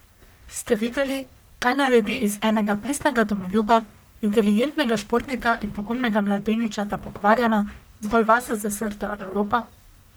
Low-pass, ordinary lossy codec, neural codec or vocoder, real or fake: none; none; codec, 44.1 kHz, 1.7 kbps, Pupu-Codec; fake